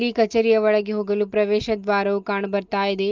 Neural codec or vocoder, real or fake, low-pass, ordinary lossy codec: none; real; 7.2 kHz; Opus, 32 kbps